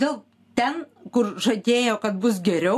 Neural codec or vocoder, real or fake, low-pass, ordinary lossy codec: none; real; 14.4 kHz; AAC, 64 kbps